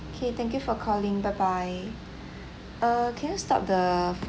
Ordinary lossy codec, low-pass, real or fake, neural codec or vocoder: none; none; real; none